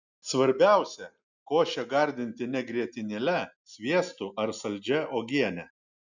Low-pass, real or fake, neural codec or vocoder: 7.2 kHz; real; none